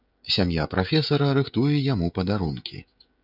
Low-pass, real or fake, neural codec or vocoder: 5.4 kHz; fake; codec, 16 kHz, 16 kbps, FreqCodec, smaller model